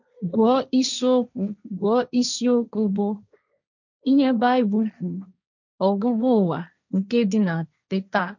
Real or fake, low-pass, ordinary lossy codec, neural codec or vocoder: fake; 7.2 kHz; none; codec, 16 kHz, 1.1 kbps, Voila-Tokenizer